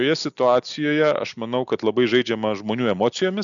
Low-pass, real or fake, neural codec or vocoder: 7.2 kHz; real; none